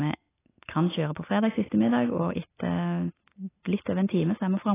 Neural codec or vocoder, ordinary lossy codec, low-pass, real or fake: none; AAC, 16 kbps; 3.6 kHz; real